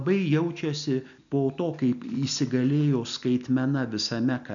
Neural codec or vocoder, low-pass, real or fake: none; 7.2 kHz; real